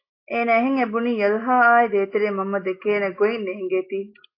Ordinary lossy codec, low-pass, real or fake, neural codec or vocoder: AAC, 32 kbps; 5.4 kHz; real; none